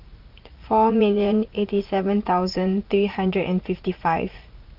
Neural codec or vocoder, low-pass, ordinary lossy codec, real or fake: vocoder, 44.1 kHz, 80 mel bands, Vocos; 5.4 kHz; Opus, 32 kbps; fake